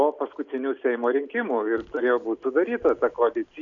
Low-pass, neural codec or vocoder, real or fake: 7.2 kHz; none; real